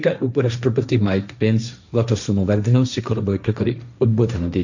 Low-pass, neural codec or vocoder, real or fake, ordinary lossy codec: 7.2 kHz; codec, 16 kHz, 1.1 kbps, Voila-Tokenizer; fake; none